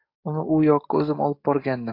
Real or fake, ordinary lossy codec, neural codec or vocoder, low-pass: fake; AAC, 32 kbps; codec, 44.1 kHz, 7.8 kbps, DAC; 5.4 kHz